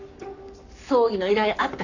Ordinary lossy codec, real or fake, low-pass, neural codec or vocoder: none; fake; 7.2 kHz; codec, 44.1 kHz, 7.8 kbps, Pupu-Codec